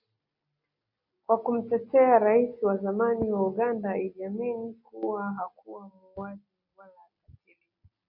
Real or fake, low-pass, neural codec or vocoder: real; 5.4 kHz; none